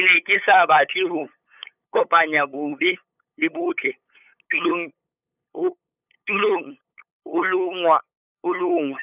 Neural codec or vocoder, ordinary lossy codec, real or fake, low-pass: codec, 16 kHz, 8 kbps, FunCodec, trained on LibriTTS, 25 frames a second; none; fake; 3.6 kHz